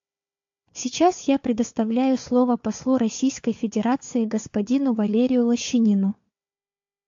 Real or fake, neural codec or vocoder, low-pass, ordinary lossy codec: fake; codec, 16 kHz, 4 kbps, FunCodec, trained on Chinese and English, 50 frames a second; 7.2 kHz; AAC, 64 kbps